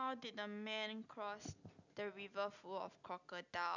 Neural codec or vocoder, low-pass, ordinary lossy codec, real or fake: none; 7.2 kHz; none; real